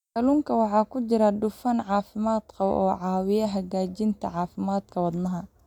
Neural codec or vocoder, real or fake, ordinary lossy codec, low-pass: none; real; none; 19.8 kHz